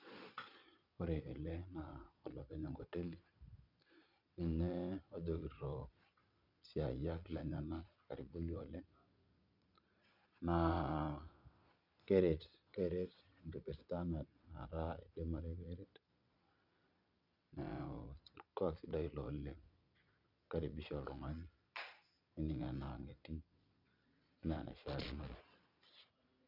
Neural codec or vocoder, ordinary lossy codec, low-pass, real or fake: vocoder, 22.05 kHz, 80 mel bands, Vocos; none; 5.4 kHz; fake